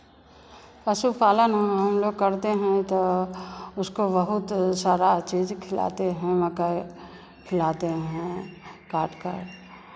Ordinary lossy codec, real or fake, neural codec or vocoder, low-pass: none; real; none; none